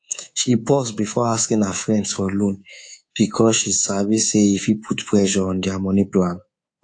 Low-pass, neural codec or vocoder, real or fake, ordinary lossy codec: 9.9 kHz; codec, 24 kHz, 3.1 kbps, DualCodec; fake; AAC, 48 kbps